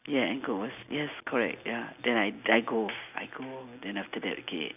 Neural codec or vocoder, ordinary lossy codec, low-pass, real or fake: none; none; 3.6 kHz; real